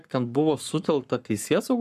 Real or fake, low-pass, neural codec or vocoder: fake; 14.4 kHz; codec, 44.1 kHz, 7.8 kbps, Pupu-Codec